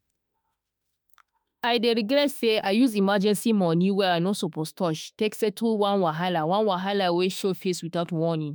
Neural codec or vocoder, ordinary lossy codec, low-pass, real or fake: autoencoder, 48 kHz, 32 numbers a frame, DAC-VAE, trained on Japanese speech; none; none; fake